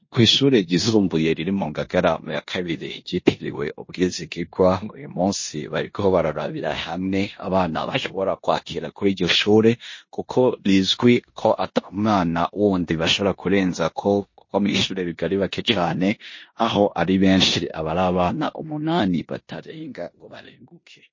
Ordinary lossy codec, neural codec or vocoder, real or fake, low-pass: MP3, 32 kbps; codec, 16 kHz in and 24 kHz out, 0.9 kbps, LongCat-Audio-Codec, four codebook decoder; fake; 7.2 kHz